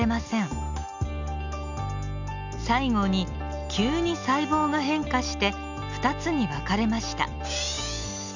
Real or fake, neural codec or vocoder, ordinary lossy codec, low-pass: real; none; none; 7.2 kHz